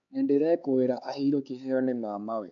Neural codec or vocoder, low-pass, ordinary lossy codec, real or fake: codec, 16 kHz, 4 kbps, X-Codec, HuBERT features, trained on LibriSpeech; 7.2 kHz; none; fake